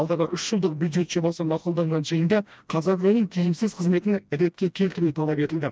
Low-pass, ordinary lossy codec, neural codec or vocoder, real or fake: none; none; codec, 16 kHz, 1 kbps, FreqCodec, smaller model; fake